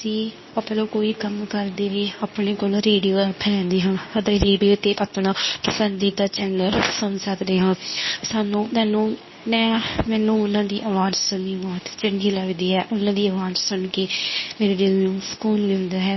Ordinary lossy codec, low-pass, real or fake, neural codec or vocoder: MP3, 24 kbps; 7.2 kHz; fake; codec, 24 kHz, 0.9 kbps, WavTokenizer, medium speech release version 2